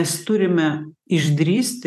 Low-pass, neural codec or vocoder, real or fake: 14.4 kHz; vocoder, 44.1 kHz, 128 mel bands every 256 samples, BigVGAN v2; fake